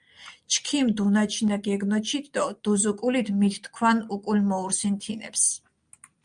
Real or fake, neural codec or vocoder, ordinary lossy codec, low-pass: real; none; Opus, 32 kbps; 9.9 kHz